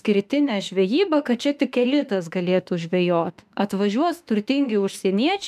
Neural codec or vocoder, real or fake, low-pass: autoencoder, 48 kHz, 32 numbers a frame, DAC-VAE, trained on Japanese speech; fake; 14.4 kHz